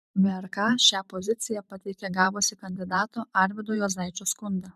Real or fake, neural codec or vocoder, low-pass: fake; vocoder, 44.1 kHz, 128 mel bands every 512 samples, BigVGAN v2; 14.4 kHz